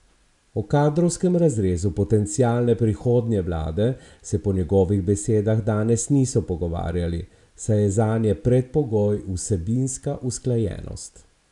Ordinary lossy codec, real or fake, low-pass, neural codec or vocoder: none; real; 10.8 kHz; none